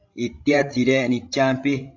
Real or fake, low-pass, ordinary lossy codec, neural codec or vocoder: fake; 7.2 kHz; AAC, 48 kbps; codec, 16 kHz, 16 kbps, FreqCodec, larger model